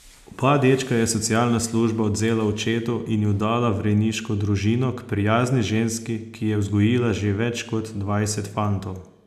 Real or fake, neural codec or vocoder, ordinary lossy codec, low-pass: real; none; none; 14.4 kHz